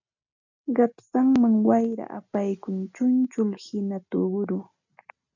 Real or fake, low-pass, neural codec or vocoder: real; 7.2 kHz; none